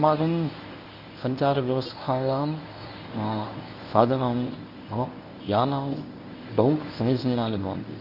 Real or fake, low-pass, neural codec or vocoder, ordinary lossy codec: fake; 5.4 kHz; codec, 24 kHz, 0.9 kbps, WavTokenizer, medium speech release version 1; none